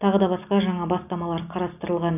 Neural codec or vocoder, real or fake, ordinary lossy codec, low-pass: none; real; none; 3.6 kHz